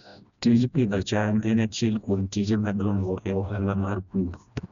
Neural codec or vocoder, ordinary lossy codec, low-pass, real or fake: codec, 16 kHz, 1 kbps, FreqCodec, smaller model; none; 7.2 kHz; fake